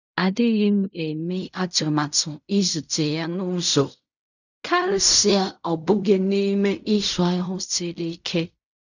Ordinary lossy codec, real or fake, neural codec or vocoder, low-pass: none; fake; codec, 16 kHz in and 24 kHz out, 0.4 kbps, LongCat-Audio-Codec, fine tuned four codebook decoder; 7.2 kHz